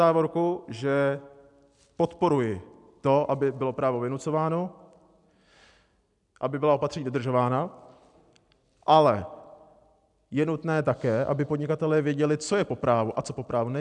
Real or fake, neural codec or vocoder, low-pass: real; none; 10.8 kHz